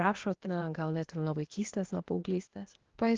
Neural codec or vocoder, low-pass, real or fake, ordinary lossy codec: codec, 16 kHz, 0.8 kbps, ZipCodec; 7.2 kHz; fake; Opus, 16 kbps